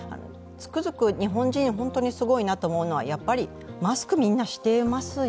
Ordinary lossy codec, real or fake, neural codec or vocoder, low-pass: none; real; none; none